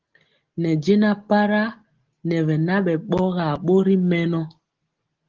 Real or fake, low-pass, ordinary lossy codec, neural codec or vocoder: real; 7.2 kHz; Opus, 16 kbps; none